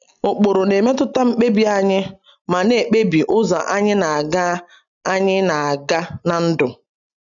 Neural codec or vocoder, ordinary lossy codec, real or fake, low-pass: none; none; real; 7.2 kHz